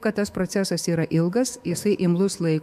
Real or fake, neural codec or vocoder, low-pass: fake; autoencoder, 48 kHz, 128 numbers a frame, DAC-VAE, trained on Japanese speech; 14.4 kHz